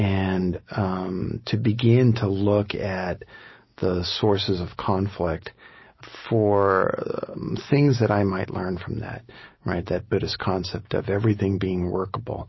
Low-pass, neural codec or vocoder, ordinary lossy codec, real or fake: 7.2 kHz; none; MP3, 24 kbps; real